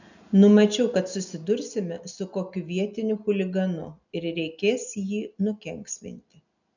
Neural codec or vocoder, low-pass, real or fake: none; 7.2 kHz; real